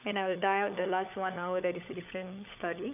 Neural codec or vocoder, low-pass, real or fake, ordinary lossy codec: codec, 16 kHz, 16 kbps, FunCodec, trained on LibriTTS, 50 frames a second; 3.6 kHz; fake; none